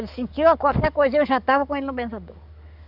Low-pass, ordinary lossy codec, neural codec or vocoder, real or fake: 5.4 kHz; none; codec, 44.1 kHz, 7.8 kbps, DAC; fake